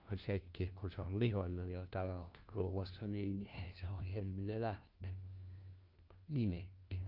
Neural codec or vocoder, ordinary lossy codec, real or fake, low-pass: codec, 16 kHz, 1 kbps, FunCodec, trained on LibriTTS, 50 frames a second; Opus, 24 kbps; fake; 5.4 kHz